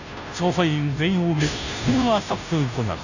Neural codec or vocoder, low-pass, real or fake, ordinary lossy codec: codec, 16 kHz, 0.5 kbps, FunCodec, trained on Chinese and English, 25 frames a second; 7.2 kHz; fake; none